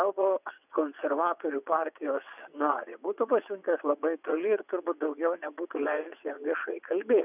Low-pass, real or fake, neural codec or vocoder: 3.6 kHz; fake; vocoder, 22.05 kHz, 80 mel bands, WaveNeXt